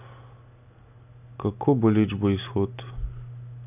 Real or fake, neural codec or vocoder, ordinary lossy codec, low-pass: real; none; AAC, 32 kbps; 3.6 kHz